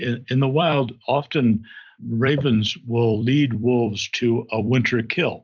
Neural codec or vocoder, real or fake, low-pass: vocoder, 44.1 kHz, 128 mel bands every 256 samples, BigVGAN v2; fake; 7.2 kHz